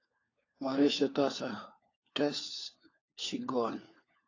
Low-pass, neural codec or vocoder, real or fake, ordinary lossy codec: 7.2 kHz; codec, 16 kHz, 4 kbps, FunCodec, trained on LibriTTS, 50 frames a second; fake; AAC, 32 kbps